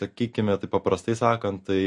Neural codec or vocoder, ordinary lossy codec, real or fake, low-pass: none; MP3, 48 kbps; real; 10.8 kHz